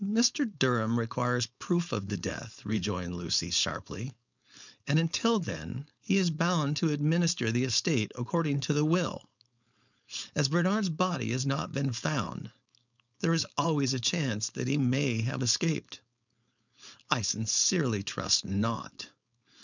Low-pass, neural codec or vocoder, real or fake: 7.2 kHz; codec, 16 kHz, 4.8 kbps, FACodec; fake